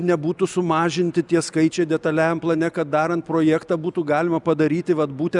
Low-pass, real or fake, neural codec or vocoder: 10.8 kHz; real; none